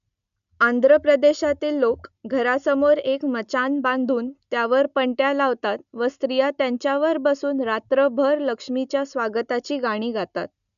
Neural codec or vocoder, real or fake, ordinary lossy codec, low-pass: none; real; none; 7.2 kHz